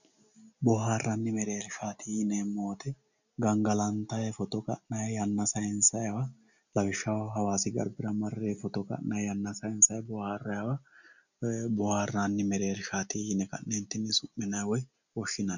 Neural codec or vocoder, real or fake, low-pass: none; real; 7.2 kHz